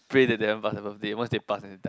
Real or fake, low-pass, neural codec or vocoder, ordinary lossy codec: real; none; none; none